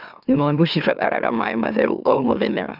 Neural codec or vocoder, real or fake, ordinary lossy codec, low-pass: autoencoder, 44.1 kHz, a latent of 192 numbers a frame, MeloTTS; fake; none; 5.4 kHz